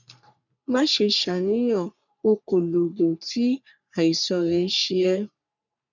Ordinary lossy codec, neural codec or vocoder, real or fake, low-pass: none; codec, 44.1 kHz, 3.4 kbps, Pupu-Codec; fake; 7.2 kHz